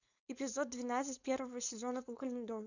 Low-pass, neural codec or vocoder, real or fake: 7.2 kHz; codec, 16 kHz, 4.8 kbps, FACodec; fake